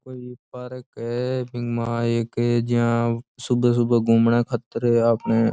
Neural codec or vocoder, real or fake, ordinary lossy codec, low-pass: none; real; none; none